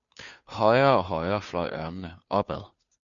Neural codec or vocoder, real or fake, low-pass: codec, 16 kHz, 2 kbps, FunCodec, trained on Chinese and English, 25 frames a second; fake; 7.2 kHz